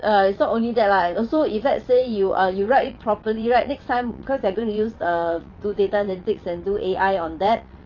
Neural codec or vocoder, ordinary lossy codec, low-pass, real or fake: vocoder, 22.05 kHz, 80 mel bands, WaveNeXt; none; 7.2 kHz; fake